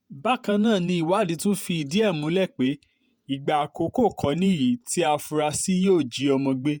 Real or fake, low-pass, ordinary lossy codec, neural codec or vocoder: fake; none; none; vocoder, 48 kHz, 128 mel bands, Vocos